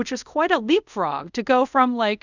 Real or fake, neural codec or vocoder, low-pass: fake; codec, 24 kHz, 0.5 kbps, DualCodec; 7.2 kHz